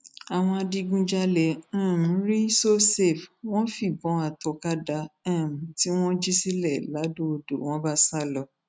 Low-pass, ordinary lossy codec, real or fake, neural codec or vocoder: none; none; real; none